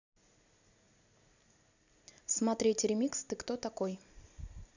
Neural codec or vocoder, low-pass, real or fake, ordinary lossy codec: none; 7.2 kHz; real; none